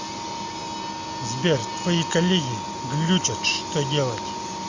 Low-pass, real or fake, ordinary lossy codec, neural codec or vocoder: 7.2 kHz; real; Opus, 64 kbps; none